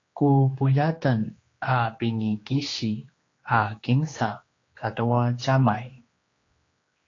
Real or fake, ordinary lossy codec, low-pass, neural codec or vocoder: fake; AAC, 32 kbps; 7.2 kHz; codec, 16 kHz, 2 kbps, X-Codec, HuBERT features, trained on general audio